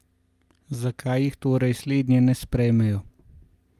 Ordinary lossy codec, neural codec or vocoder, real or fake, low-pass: Opus, 32 kbps; none; real; 14.4 kHz